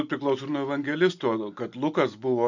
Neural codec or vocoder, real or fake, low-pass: none; real; 7.2 kHz